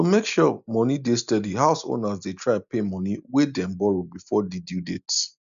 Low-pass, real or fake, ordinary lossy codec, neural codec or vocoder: 7.2 kHz; real; none; none